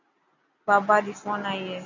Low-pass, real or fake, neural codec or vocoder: 7.2 kHz; real; none